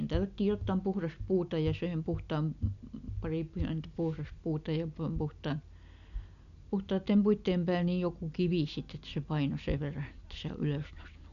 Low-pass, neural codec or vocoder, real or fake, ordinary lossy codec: 7.2 kHz; none; real; none